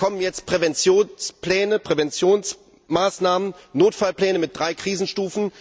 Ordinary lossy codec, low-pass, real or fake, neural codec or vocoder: none; none; real; none